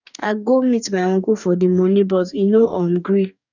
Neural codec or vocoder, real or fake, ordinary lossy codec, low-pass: codec, 44.1 kHz, 2.6 kbps, DAC; fake; none; 7.2 kHz